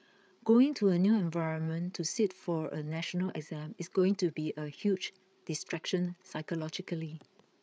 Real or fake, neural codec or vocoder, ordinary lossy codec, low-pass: fake; codec, 16 kHz, 8 kbps, FreqCodec, larger model; none; none